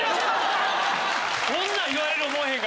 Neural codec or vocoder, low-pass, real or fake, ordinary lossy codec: none; none; real; none